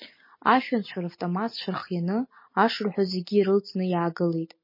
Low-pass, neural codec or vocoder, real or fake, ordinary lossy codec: 5.4 kHz; none; real; MP3, 24 kbps